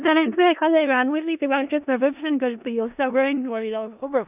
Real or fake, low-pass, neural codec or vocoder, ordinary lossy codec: fake; 3.6 kHz; codec, 16 kHz in and 24 kHz out, 0.4 kbps, LongCat-Audio-Codec, four codebook decoder; none